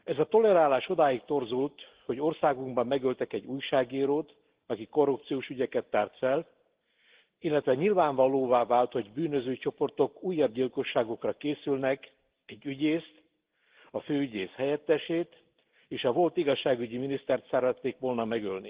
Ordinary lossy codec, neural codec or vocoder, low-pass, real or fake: Opus, 16 kbps; none; 3.6 kHz; real